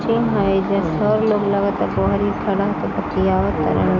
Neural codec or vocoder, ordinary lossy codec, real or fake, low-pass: none; none; real; 7.2 kHz